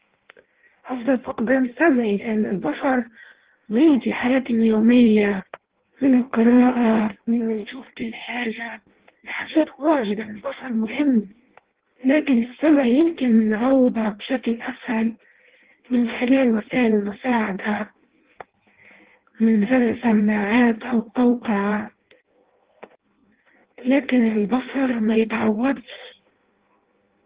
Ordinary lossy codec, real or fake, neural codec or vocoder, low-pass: Opus, 16 kbps; fake; codec, 16 kHz in and 24 kHz out, 0.6 kbps, FireRedTTS-2 codec; 3.6 kHz